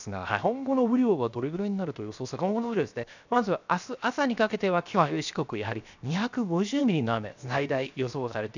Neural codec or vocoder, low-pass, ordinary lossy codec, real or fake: codec, 16 kHz, 0.7 kbps, FocalCodec; 7.2 kHz; none; fake